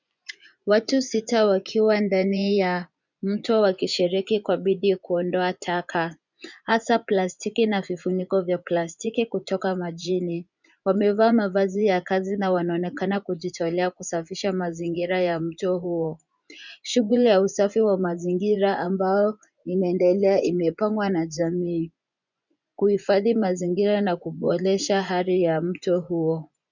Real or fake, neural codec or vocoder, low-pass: fake; vocoder, 24 kHz, 100 mel bands, Vocos; 7.2 kHz